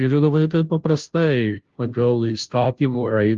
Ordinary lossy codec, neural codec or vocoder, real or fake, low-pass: Opus, 32 kbps; codec, 16 kHz, 0.5 kbps, FunCodec, trained on Chinese and English, 25 frames a second; fake; 7.2 kHz